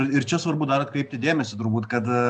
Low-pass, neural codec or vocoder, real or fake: 9.9 kHz; none; real